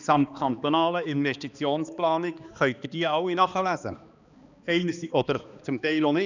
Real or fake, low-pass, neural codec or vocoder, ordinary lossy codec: fake; 7.2 kHz; codec, 16 kHz, 2 kbps, X-Codec, HuBERT features, trained on balanced general audio; none